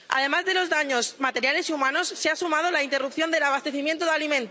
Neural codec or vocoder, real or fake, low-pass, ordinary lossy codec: none; real; none; none